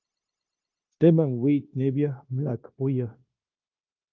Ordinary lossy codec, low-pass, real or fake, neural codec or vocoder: Opus, 32 kbps; 7.2 kHz; fake; codec, 16 kHz, 0.9 kbps, LongCat-Audio-Codec